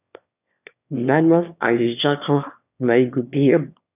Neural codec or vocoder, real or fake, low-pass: autoencoder, 22.05 kHz, a latent of 192 numbers a frame, VITS, trained on one speaker; fake; 3.6 kHz